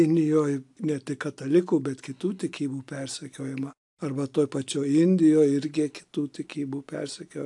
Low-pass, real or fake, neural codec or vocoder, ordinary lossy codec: 10.8 kHz; real; none; AAC, 64 kbps